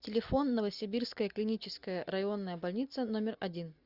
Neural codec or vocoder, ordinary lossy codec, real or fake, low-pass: none; Opus, 64 kbps; real; 5.4 kHz